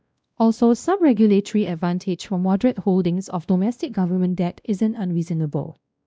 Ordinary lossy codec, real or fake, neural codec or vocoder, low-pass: none; fake; codec, 16 kHz, 1 kbps, X-Codec, WavLM features, trained on Multilingual LibriSpeech; none